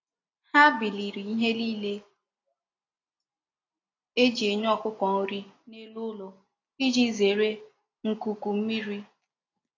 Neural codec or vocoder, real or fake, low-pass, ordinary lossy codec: none; real; 7.2 kHz; AAC, 32 kbps